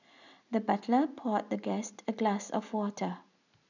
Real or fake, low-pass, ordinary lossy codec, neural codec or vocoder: real; 7.2 kHz; none; none